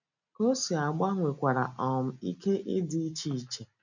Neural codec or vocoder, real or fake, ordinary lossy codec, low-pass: none; real; none; 7.2 kHz